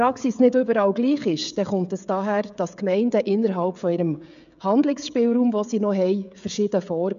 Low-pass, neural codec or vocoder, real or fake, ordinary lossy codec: 7.2 kHz; codec, 16 kHz, 16 kbps, FreqCodec, smaller model; fake; none